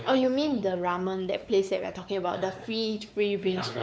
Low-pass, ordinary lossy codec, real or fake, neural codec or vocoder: none; none; fake; codec, 16 kHz, 4 kbps, X-Codec, WavLM features, trained on Multilingual LibriSpeech